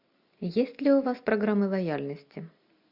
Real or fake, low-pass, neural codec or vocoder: real; 5.4 kHz; none